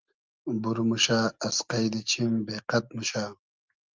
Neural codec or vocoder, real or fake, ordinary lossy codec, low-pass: none; real; Opus, 24 kbps; 7.2 kHz